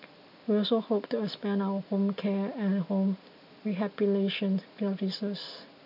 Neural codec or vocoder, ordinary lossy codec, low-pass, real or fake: none; none; 5.4 kHz; real